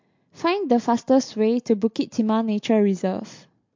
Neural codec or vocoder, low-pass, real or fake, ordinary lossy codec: none; 7.2 kHz; real; MP3, 48 kbps